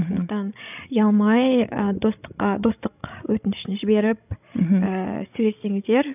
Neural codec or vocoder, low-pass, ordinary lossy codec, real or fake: codec, 16 kHz, 16 kbps, FreqCodec, larger model; 3.6 kHz; none; fake